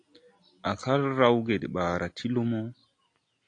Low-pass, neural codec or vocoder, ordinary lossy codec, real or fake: 9.9 kHz; none; AAC, 48 kbps; real